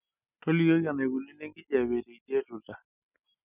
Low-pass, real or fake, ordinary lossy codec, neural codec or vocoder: 3.6 kHz; real; none; none